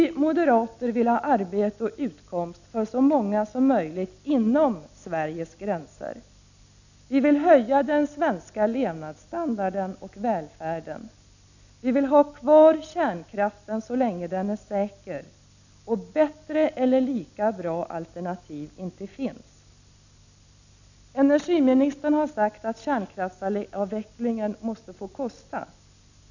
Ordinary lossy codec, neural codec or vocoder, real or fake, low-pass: none; none; real; 7.2 kHz